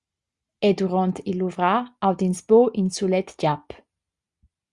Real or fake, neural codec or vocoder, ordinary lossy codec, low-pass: real; none; Opus, 64 kbps; 10.8 kHz